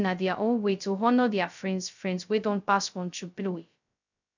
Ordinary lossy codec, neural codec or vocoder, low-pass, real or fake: none; codec, 16 kHz, 0.2 kbps, FocalCodec; 7.2 kHz; fake